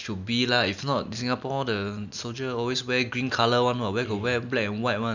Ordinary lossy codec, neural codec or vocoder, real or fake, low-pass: none; none; real; 7.2 kHz